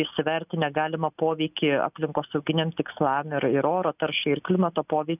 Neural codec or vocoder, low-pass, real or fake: none; 3.6 kHz; real